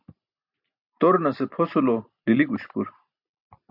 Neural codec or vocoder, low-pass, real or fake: none; 5.4 kHz; real